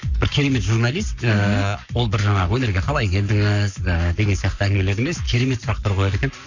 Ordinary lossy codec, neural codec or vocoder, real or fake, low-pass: none; codec, 44.1 kHz, 7.8 kbps, Pupu-Codec; fake; 7.2 kHz